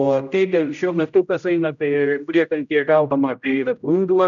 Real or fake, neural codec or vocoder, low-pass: fake; codec, 16 kHz, 0.5 kbps, X-Codec, HuBERT features, trained on general audio; 7.2 kHz